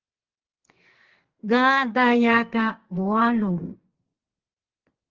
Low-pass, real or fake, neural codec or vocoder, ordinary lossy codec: 7.2 kHz; fake; codec, 44.1 kHz, 2.6 kbps, SNAC; Opus, 16 kbps